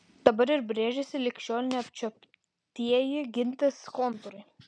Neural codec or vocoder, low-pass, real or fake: none; 9.9 kHz; real